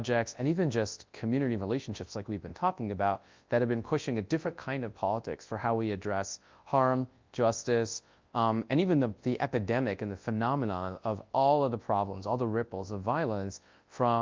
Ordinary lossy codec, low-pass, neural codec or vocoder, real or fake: Opus, 24 kbps; 7.2 kHz; codec, 24 kHz, 0.9 kbps, WavTokenizer, large speech release; fake